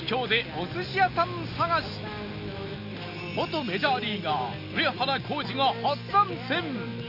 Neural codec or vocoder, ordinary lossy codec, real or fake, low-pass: none; none; real; 5.4 kHz